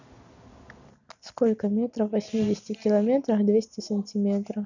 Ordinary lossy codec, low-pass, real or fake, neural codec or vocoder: none; 7.2 kHz; real; none